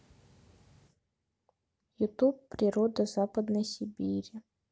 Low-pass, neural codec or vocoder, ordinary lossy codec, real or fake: none; none; none; real